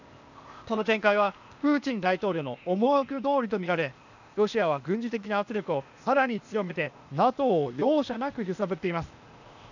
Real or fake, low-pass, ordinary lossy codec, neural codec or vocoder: fake; 7.2 kHz; none; codec, 16 kHz, 0.8 kbps, ZipCodec